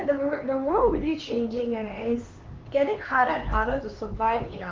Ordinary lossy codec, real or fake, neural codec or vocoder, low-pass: Opus, 24 kbps; fake; codec, 16 kHz, 4 kbps, X-Codec, HuBERT features, trained on LibriSpeech; 7.2 kHz